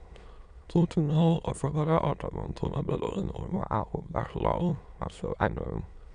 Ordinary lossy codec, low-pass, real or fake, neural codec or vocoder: MP3, 96 kbps; 9.9 kHz; fake; autoencoder, 22.05 kHz, a latent of 192 numbers a frame, VITS, trained on many speakers